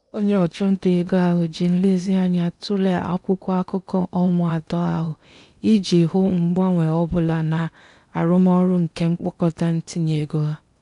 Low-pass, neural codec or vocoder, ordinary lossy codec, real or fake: 10.8 kHz; codec, 16 kHz in and 24 kHz out, 0.8 kbps, FocalCodec, streaming, 65536 codes; none; fake